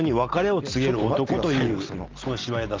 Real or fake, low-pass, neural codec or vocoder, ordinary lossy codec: real; 7.2 kHz; none; Opus, 32 kbps